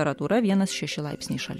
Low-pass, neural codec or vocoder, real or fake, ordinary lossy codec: 19.8 kHz; none; real; MP3, 64 kbps